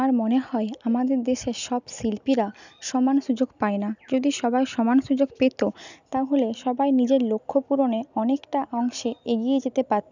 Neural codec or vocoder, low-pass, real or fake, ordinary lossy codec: none; 7.2 kHz; real; none